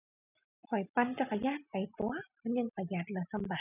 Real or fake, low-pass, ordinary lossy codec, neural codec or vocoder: real; 3.6 kHz; none; none